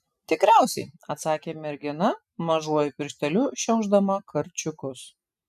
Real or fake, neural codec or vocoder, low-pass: real; none; 14.4 kHz